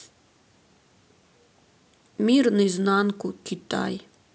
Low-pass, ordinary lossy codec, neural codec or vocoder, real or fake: none; none; none; real